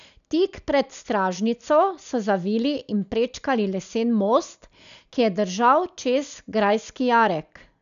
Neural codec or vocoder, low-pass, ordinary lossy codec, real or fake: none; 7.2 kHz; none; real